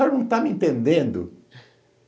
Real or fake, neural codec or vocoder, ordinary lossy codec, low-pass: real; none; none; none